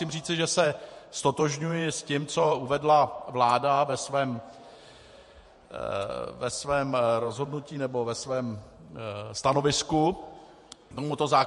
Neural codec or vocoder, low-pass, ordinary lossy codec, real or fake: vocoder, 48 kHz, 128 mel bands, Vocos; 14.4 kHz; MP3, 48 kbps; fake